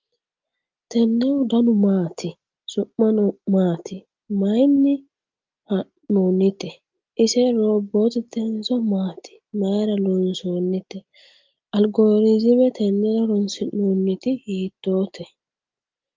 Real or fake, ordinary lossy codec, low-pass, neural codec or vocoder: real; Opus, 32 kbps; 7.2 kHz; none